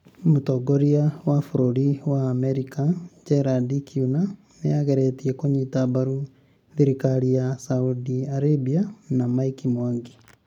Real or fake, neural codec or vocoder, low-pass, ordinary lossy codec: real; none; 19.8 kHz; none